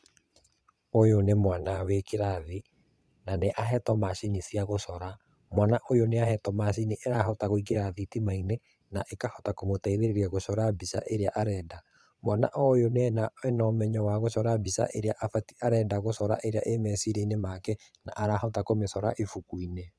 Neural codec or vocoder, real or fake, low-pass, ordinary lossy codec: vocoder, 22.05 kHz, 80 mel bands, Vocos; fake; none; none